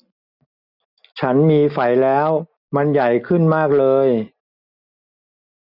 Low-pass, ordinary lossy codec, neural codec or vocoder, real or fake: 5.4 kHz; none; none; real